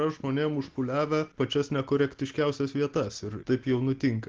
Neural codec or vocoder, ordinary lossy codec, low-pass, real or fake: none; Opus, 16 kbps; 7.2 kHz; real